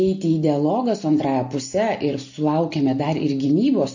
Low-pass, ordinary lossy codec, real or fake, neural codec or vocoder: 7.2 kHz; AAC, 48 kbps; real; none